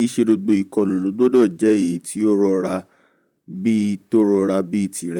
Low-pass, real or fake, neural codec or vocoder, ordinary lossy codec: 19.8 kHz; fake; vocoder, 44.1 kHz, 128 mel bands, Pupu-Vocoder; none